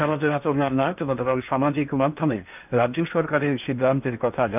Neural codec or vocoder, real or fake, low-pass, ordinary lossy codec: codec, 16 kHz in and 24 kHz out, 0.8 kbps, FocalCodec, streaming, 65536 codes; fake; 3.6 kHz; none